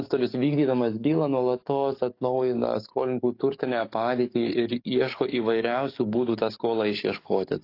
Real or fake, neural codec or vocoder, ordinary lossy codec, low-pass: fake; codec, 44.1 kHz, 7.8 kbps, DAC; AAC, 32 kbps; 5.4 kHz